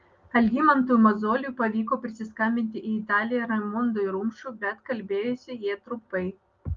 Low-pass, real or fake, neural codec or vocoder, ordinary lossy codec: 7.2 kHz; real; none; Opus, 32 kbps